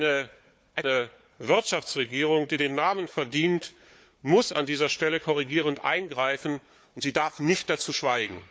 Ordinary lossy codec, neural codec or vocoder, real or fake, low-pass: none; codec, 16 kHz, 4 kbps, FunCodec, trained on Chinese and English, 50 frames a second; fake; none